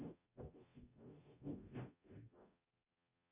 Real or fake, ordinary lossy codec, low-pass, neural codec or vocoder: fake; Opus, 64 kbps; 3.6 kHz; codec, 44.1 kHz, 0.9 kbps, DAC